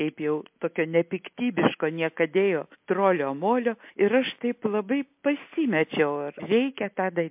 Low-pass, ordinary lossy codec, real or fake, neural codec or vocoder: 3.6 kHz; MP3, 32 kbps; real; none